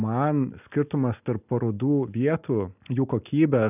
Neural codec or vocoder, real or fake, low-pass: none; real; 3.6 kHz